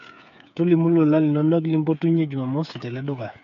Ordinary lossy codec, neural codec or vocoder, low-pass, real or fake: none; codec, 16 kHz, 8 kbps, FreqCodec, smaller model; 7.2 kHz; fake